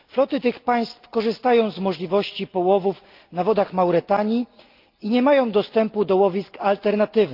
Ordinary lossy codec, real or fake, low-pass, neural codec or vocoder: Opus, 32 kbps; real; 5.4 kHz; none